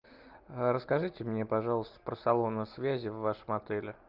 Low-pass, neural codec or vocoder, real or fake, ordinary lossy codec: 5.4 kHz; none; real; Opus, 24 kbps